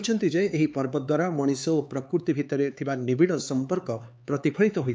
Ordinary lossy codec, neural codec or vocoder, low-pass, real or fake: none; codec, 16 kHz, 4 kbps, X-Codec, HuBERT features, trained on LibriSpeech; none; fake